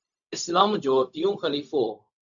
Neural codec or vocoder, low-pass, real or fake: codec, 16 kHz, 0.4 kbps, LongCat-Audio-Codec; 7.2 kHz; fake